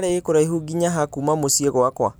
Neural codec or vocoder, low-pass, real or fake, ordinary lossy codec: none; none; real; none